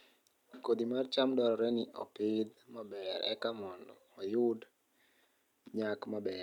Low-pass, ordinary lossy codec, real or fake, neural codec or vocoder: 19.8 kHz; none; real; none